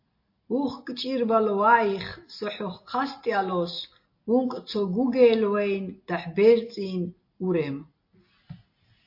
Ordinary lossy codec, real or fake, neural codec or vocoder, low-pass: MP3, 32 kbps; real; none; 5.4 kHz